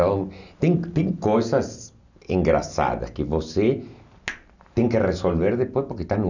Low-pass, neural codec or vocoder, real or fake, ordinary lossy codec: 7.2 kHz; none; real; none